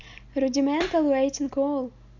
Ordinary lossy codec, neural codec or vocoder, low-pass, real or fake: none; none; 7.2 kHz; real